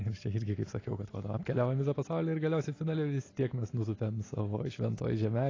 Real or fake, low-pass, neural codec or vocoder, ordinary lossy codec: real; 7.2 kHz; none; AAC, 32 kbps